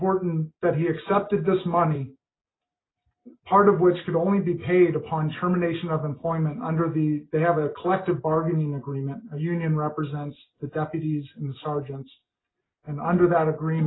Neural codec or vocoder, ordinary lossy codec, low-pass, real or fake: none; AAC, 16 kbps; 7.2 kHz; real